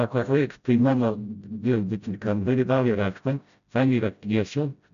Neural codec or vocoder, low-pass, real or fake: codec, 16 kHz, 0.5 kbps, FreqCodec, smaller model; 7.2 kHz; fake